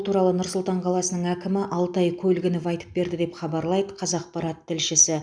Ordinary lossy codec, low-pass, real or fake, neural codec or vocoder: none; 9.9 kHz; real; none